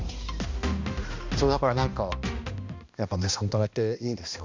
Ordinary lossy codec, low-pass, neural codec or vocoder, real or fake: MP3, 64 kbps; 7.2 kHz; codec, 16 kHz, 1 kbps, X-Codec, HuBERT features, trained on balanced general audio; fake